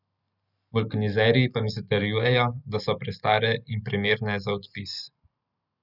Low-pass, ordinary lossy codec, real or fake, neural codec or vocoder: 5.4 kHz; none; real; none